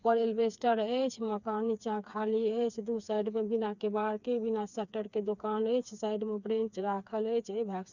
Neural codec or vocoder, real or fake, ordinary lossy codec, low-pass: codec, 16 kHz, 4 kbps, FreqCodec, smaller model; fake; none; 7.2 kHz